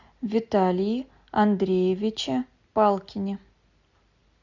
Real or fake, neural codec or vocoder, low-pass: real; none; 7.2 kHz